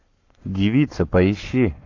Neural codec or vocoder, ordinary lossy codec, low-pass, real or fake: codec, 44.1 kHz, 7.8 kbps, Pupu-Codec; AAC, 48 kbps; 7.2 kHz; fake